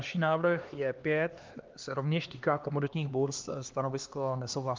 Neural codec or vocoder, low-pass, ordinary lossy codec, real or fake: codec, 16 kHz, 2 kbps, X-Codec, HuBERT features, trained on LibriSpeech; 7.2 kHz; Opus, 32 kbps; fake